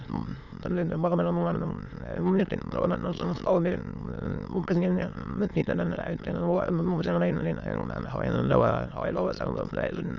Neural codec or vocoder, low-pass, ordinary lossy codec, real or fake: autoencoder, 22.05 kHz, a latent of 192 numbers a frame, VITS, trained on many speakers; 7.2 kHz; none; fake